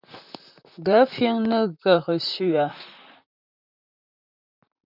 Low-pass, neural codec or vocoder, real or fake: 5.4 kHz; vocoder, 44.1 kHz, 128 mel bands, Pupu-Vocoder; fake